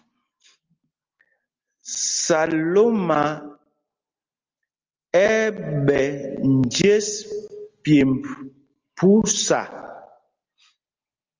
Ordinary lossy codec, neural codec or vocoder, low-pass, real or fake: Opus, 32 kbps; none; 7.2 kHz; real